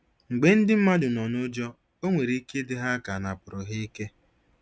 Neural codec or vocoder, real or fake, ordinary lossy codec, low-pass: none; real; none; none